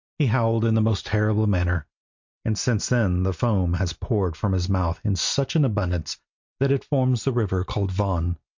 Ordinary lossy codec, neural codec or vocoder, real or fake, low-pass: MP3, 48 kbps; none; real; 7.2 kHz